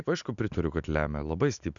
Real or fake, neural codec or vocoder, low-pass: real; none; 7.2 kHz